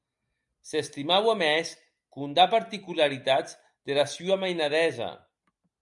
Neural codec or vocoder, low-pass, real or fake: none; 10.8 kHz; real